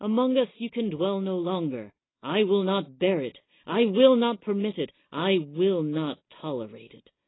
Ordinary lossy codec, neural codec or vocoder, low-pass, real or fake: AAC, 16 kbps; none; 7.2 kHz; real